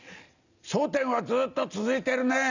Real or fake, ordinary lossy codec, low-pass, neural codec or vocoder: real; none; 7.2 kHz; none